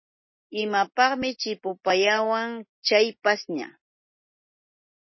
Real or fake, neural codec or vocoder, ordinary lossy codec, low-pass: real; none; MP3, 24 kbps; 7.2 kHz